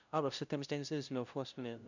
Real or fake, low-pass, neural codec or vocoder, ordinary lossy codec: fake; 7.2 kHz; codec, 16 kHz, 0.5 kbps, FunCodec, trained on LibriTTS, 25 frames a second; none